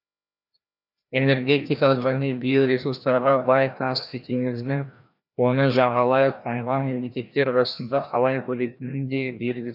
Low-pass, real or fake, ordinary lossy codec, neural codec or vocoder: 5.4 kHz; fake; none; codec, 16 kHz, 1 kbps, FreqCodec, larger model